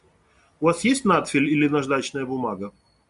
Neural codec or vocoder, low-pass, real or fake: none; 10.8 kHz; real